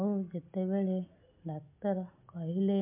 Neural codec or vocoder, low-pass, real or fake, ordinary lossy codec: none; 3.6 kHz; real; MP3, 32 kbps